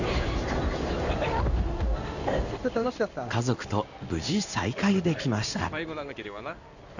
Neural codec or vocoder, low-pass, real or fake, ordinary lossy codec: codec, 16 kHz in and 24 kHz out, 1 kbps, XY-Tokenizer; 7.2 kHz; fake; none